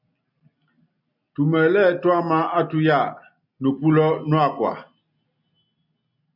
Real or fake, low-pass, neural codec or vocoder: real; 5.4 kHz; none